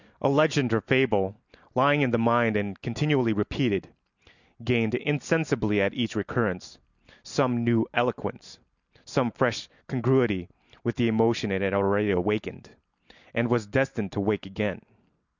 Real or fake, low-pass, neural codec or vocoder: real; 7.2 kHz; none